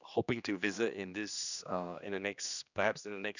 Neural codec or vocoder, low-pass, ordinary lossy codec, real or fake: codec, 16 kHz, 2 kbps, X-Codec, HuBERT features, trained on general audio; 7.2 kHz; none; fake